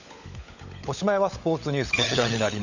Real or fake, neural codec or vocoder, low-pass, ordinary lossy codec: fake; codec, 16 kHz, 16 kbps, FunCodec, trained on LibriTTS, 50 frames a second; 7.2 kHz; none